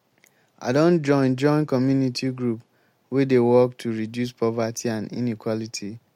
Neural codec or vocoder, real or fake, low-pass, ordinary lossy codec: none; real; 19.8 kHz; MP3, 64 kbps